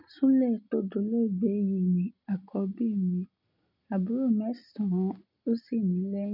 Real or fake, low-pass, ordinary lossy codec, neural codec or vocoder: real; 5.4 kHz; none; none